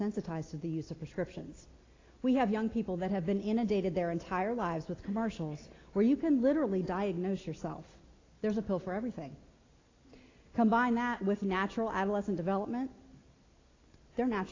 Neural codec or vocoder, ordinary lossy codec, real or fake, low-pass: none; AAC, 32 kbps; real; 7.2 kHz